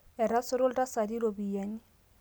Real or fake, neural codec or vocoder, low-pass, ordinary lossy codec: real; none; none; none